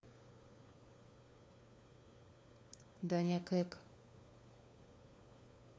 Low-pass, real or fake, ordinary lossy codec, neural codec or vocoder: none; fake; none; codec, 16 kHz, 4 kbps, FunCodec, trained on LibriTTS, 50 frames a second